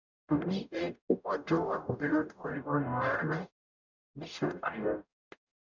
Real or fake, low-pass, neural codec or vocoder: fake; 7.2 kHz; codec, 44.1 kHz, 0.9 kbps, DAC